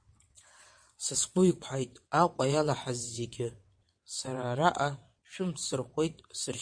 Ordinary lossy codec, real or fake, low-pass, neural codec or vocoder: MP3, 48 kbps; fake; 9.9 kHz; vocoder, 22.05 kHz, 80 mel bands, WaveNeXt